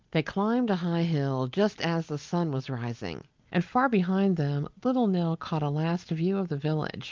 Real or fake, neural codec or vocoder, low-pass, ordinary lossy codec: real; none; 7.2 kHz; Opus, 24 kbps